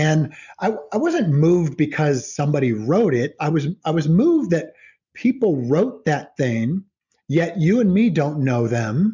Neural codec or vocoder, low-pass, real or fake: none; 7.2 kHz; real